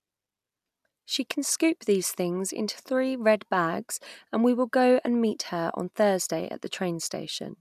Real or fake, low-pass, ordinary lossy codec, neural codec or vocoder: real; 14.4 kHz; none; none